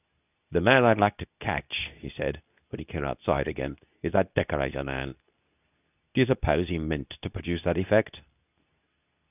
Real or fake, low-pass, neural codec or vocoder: fake; 3.6 kHz; codec, 24 kHz, 0.9 kbps, WavTokenizer, medium speech release version 2